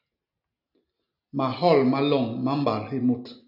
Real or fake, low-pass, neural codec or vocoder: real; 5.4 kHz; none